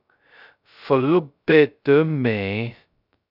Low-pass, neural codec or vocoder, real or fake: 5.4 kHz; codec, 16 kHz, 0.2 kbps, FocalCodec; fake